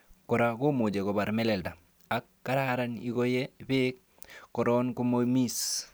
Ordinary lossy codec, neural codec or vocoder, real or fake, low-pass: none; none; real; none